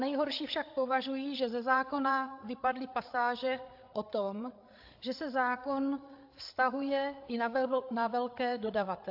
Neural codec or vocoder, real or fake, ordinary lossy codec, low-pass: codec, 16 kHz, 8 kbps, FreqCodec, larger model; fake; AAC, 48 kbps; 5.4 kHz